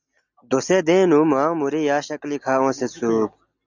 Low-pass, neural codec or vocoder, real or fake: 7.2 kHz; none; real